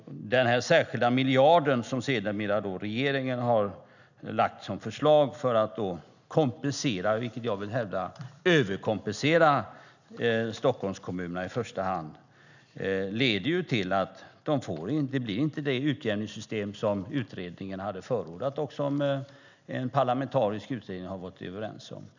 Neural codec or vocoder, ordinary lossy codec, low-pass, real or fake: none; none; 7.2 kHz; real